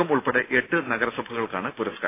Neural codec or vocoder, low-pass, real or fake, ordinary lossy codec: none; 3.6 kHz; real; AAC, 24 kbps